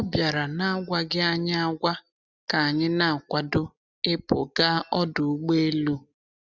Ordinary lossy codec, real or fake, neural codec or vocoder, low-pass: none; real; none; 7.2 kHz